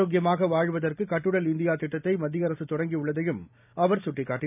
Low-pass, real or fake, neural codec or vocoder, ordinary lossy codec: 3.6 kHz; real; none; none